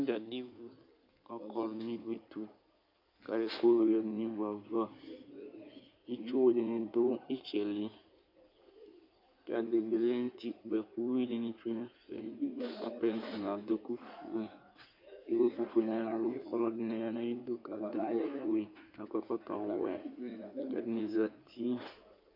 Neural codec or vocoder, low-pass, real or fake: codec, 16 kHz in and 24 kHz out, 2.2 kbps, FireRedTTS-2 codec; 5.4 kHz; fake